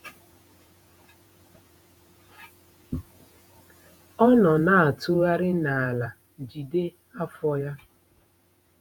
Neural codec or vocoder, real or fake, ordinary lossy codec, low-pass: vocoder, 48 kHz, 128 mel bands, Vocos; fake; none; none